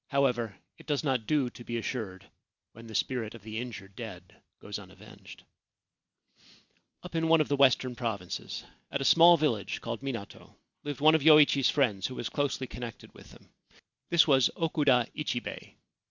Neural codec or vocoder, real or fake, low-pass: none; real; 7.2 kHz